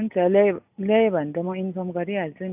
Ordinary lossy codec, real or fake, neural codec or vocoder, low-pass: AAC, 32 kbps; real; none; 3.6 kHz